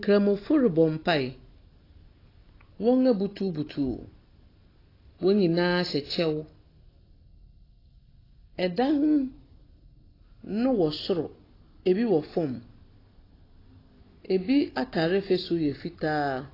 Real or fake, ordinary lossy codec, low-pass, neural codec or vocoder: real; AAC, 24 kbps; 5.4 kHz; none